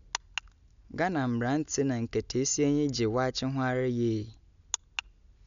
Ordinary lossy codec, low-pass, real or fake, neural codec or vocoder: none; 7.2 kHz; real; none